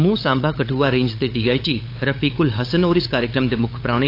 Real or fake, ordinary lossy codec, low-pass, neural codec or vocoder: fake; AAC, 32 kbps; 5.4 kHz; codec, 16 kHz, 8 kbps, FunCodec, trained on LibriTTS, 25 frames a second